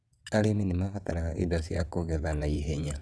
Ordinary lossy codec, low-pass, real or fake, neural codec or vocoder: none; none; fake; vocoder, 22.05 kHz, 80 mel bands, WaveNeXt